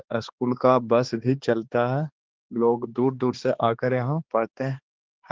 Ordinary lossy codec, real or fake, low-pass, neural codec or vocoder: Opus, 16 kbps; fake; 7.2 kHz; codec, 16 kHz, 2 kbps, X-Codec, HuBERT features, trained on balanced general audio